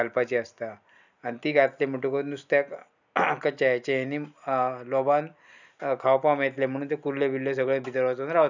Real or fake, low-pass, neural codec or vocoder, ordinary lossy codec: real; 7.2 kHz; none; none